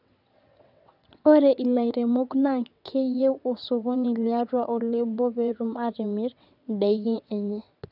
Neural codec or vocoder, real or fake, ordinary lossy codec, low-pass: vocoder, 22.05 kHz, 80 mel bands, WaveNeXt; fake; none; 5.4 kHz